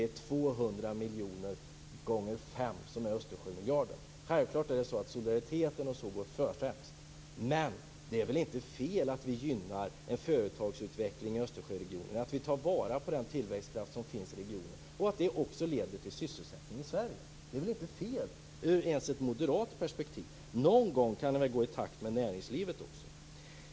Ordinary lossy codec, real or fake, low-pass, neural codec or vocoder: none; real; none; none